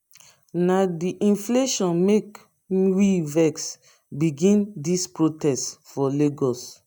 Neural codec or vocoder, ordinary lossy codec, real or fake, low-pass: none; none; real; none